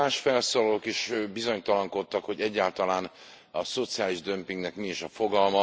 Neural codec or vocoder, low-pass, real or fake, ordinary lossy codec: none; none; real; none